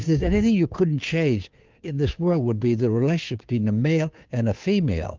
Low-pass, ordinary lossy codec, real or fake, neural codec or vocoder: 7.2 kHz; Opus, 24 kbps; fake; codec, 16 kHz, 2 kbps, FunCodec, trained on Chinese and English, 25 frames a second